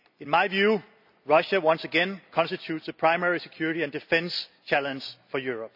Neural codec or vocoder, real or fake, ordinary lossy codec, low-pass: none; real; none; 5.4 kHz